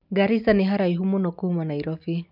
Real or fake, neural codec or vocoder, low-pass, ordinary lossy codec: real; none; 5.4 kHz; none